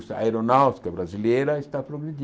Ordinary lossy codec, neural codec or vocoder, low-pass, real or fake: none; none; none; real